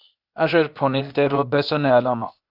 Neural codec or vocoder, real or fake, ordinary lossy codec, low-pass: codec, 16 kHz, 0.8 kbps, ZipCodec; fake; AAC, 48 kbps; 5.4 kHz